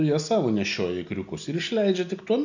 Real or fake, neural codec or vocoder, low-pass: real; none; 7.2 kHz